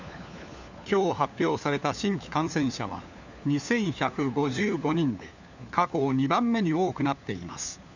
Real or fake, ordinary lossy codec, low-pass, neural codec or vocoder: fake; none; 7.2 kHz; codec, 16 kHz, 4 kbps, FunCodec, trained on LibriTTS, 50 frames a second